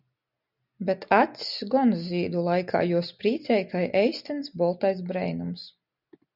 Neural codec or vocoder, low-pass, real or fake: none; 5.4 kHz; real